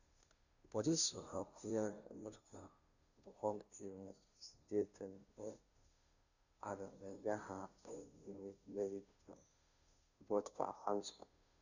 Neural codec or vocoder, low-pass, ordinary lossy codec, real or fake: codec, 16 kHz, 0.5 kbps, FunCodec, trained on Chinese and English, 25 frames a second; 7.2 kHz; none; fake